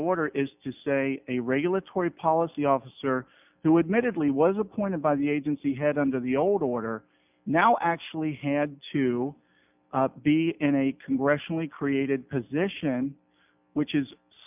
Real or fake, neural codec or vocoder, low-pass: real; none; 3.6 kHz